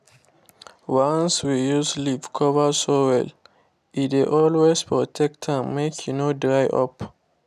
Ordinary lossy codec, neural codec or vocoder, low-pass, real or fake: none; none; 14.4 kHz; real